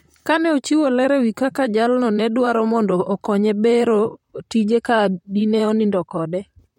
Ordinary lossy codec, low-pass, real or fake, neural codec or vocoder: MP3, 64 kbps; 19.8 kHz; fake; vocoder, 44.1 kHz, 128 mel bands, Pupu-Vocoder